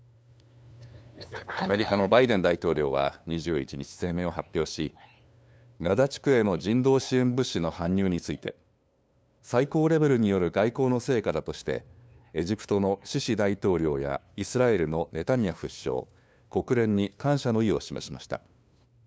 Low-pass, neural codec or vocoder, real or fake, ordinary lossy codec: none; codec, 16 kHz, 2 kbps, FunCodec, trained on LibriTTS, 25 frames a second; fake; none